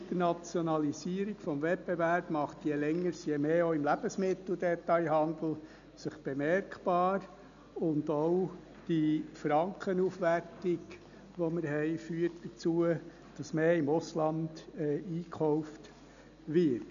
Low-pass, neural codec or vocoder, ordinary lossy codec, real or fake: 7.2 kHz; none; none; real